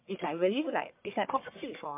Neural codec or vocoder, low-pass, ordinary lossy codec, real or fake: codec, 44.1 kHz, 1.7 kbps, Pupu-Codec; 3.6 kHz; MP3, 32 kbps; fake